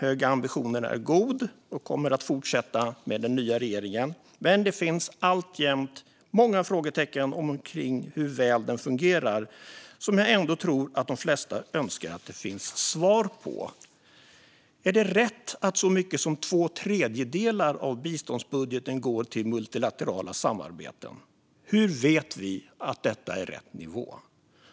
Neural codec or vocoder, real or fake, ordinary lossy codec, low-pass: none; real; none; none